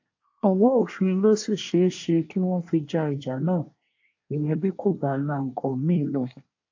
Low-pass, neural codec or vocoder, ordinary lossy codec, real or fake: 7.2 kHz; codec, 24 kHz, 1 kbps, SNAC; AAC, 48 kbps; fake